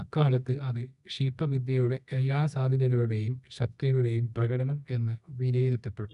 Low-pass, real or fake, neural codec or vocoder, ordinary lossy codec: 10.8 kHz; fake; codec, 24 kHz, 0.9 kbps, WavTokenizer, medium music audio release; none